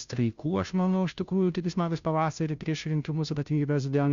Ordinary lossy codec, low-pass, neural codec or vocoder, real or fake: Opus, 64 kbps; 7.2 kHz; codec, 16 kHz, 0.5 kbps, FunCodec, trained on Chinese and English, 25 frames a second; fake